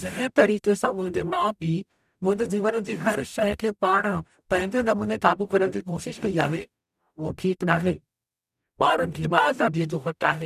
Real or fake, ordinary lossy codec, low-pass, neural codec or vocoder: fake; none; 14.4 kHz; codec, 44.1 kHz, 0.9 kbps, DAC